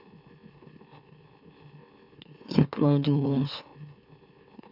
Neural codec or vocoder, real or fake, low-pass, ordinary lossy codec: autoencoder, 44.1 kHz, a latent of 192 numbers a frame, MeloTTS; fake; 5.4 kHz; none